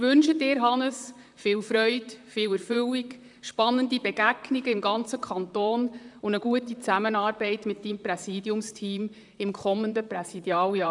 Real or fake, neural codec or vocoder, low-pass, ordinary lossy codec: fake; vocoder, 44.1 kHz, 128 mel bands, Pupu-Vocoder; 10.8 kHz; MP3, 96 kbps